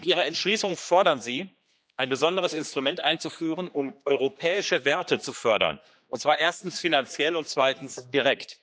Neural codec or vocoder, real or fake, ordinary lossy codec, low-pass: codec, 16 kHz, 2 kbps, X-Codec, HuBERT features, trained on general audio; fake; none; none